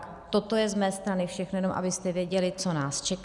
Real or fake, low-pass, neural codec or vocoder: real; 10.8 kHz; none